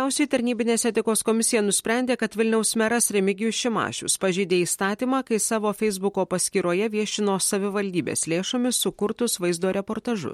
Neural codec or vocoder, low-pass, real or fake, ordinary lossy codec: none; 19.8 kHz; real; MP3, 64 kbps